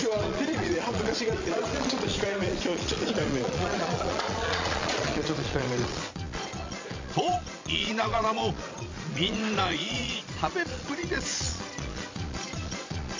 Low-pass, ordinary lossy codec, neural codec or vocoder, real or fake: 7.2 kHz; MP3, 48 kbps; vocoder, 22.05 kHz, 80 mel bands, Vocos; fake